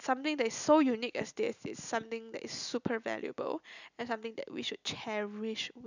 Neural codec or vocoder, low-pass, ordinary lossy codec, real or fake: none; 7.2 kHz; none; real